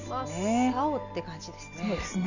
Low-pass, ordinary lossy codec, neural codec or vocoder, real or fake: 7.2 kHz; none; none; real